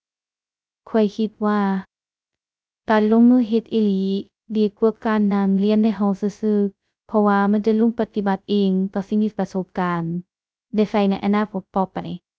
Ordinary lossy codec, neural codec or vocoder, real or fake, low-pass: none; codec, 16 kHz, 0.2 kbps, FocalCodec; fake; none